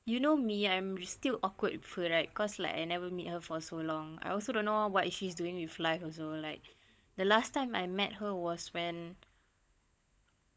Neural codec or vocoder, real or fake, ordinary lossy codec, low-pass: codec, 16 kHz, 16 kbps, FunCodec, trained on LibriTTS, 50 frames a second; fake; none; none